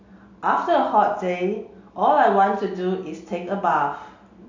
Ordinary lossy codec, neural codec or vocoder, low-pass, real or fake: AAC, 48 kbps; none; 7.2 kHz; real